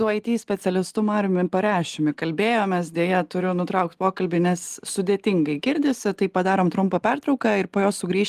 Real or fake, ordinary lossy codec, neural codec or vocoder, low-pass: real; Opus, 24 kbps; none; 14.4 kHz